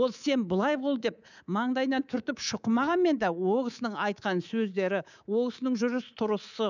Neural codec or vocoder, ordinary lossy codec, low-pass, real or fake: codec, 24 kHz, 3.1 kbps, DualCodec; none; 7.2 kHz; fake